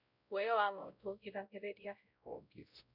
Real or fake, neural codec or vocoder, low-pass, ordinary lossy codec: fake; codec, 16 kHz, 0.5 kbps, X-Codec, WavLM features, trained on Multilingual LibriSpeech; 5.4 kHz; none